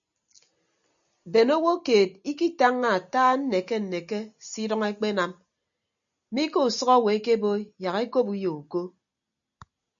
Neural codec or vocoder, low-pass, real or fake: none; 7.2 kHz; real